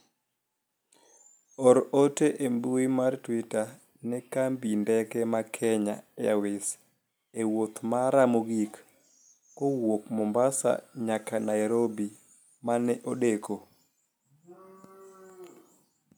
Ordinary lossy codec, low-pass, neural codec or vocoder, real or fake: none; none; none; real